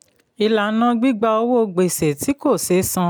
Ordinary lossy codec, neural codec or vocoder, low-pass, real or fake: none; none; none; real